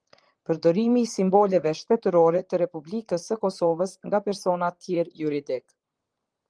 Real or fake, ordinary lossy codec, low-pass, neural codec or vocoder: fake; Opus, 24 kbps; 9.9 kHz; vocoder, 44.1 kHz, 128 mel bands, Pupu-Vocoder